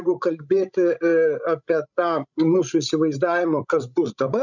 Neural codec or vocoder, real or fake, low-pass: codec, 16 kHz, 16 kbps, FreqCodec, larger model; fake; 7.2 kHz